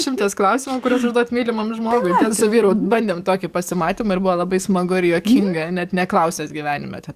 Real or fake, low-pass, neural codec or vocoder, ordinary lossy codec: fake; 14.4 kHz; codec, 44.1 kHz, 7.8 kbps, DAC; Opus, 64 kbps